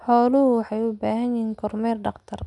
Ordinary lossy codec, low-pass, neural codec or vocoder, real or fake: none; 10.8 kHz; none; real